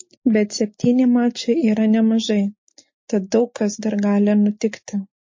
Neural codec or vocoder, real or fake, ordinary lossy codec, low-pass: none; real; MP3, 32 kbps; 7.2 kHz